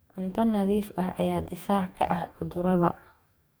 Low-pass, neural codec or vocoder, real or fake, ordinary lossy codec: none; codec, 44.1 kHz, 2.6 kbps, DAC; fake; none